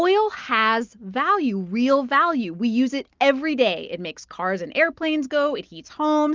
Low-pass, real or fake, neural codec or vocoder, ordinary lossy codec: 7.2 kHz; real; none; Opus, 24 kbps